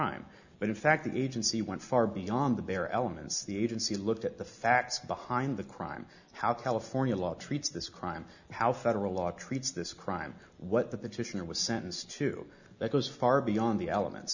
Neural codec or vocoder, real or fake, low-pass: none; real; 7.2 kHz